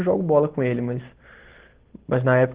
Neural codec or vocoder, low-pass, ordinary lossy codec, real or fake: none; 3.6 kHz; Opus, 16 kbps; real